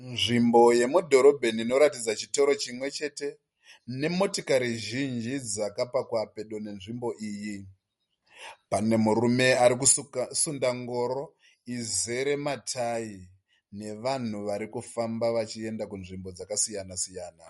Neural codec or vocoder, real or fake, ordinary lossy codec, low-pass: none; real; MP3, 48 kbps; 19.8 kHz